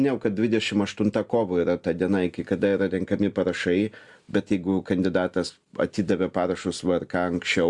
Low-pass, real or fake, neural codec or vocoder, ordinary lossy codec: 10.8 kHz; real; none; Opus, 64 kbps